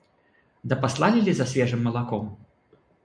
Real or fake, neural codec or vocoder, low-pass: real; none; 9.9 kHz